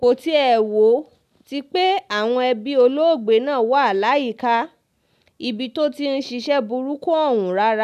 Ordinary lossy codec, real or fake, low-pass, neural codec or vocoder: none; real; 14.4 kHz; none